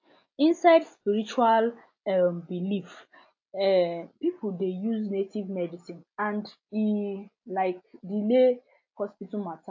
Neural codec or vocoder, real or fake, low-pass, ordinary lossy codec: none; real; 7.2 kHz; AAC, 48 kbps